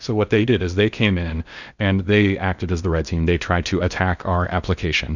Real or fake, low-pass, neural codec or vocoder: fake; 7.2 kHz; codec, 16 kHz in and 24 kHz out, 0.8 kbps, FocalCodec, streaming, 65536 codes